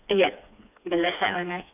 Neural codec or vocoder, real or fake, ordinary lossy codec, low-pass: codec, 16 kHz, 2 kbps, FreqCodec, smaller model; fake; none; 3.6 kHz